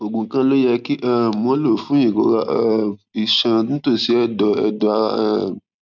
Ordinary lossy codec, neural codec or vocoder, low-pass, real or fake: none; none; 7.2 kHz; real